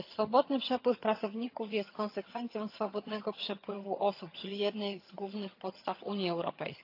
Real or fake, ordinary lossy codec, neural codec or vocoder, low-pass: fake; AAC, 32 kbps; vocoder, 22.05 kHz, 80 mel bands, HiFi-GAN; 5.4 kHz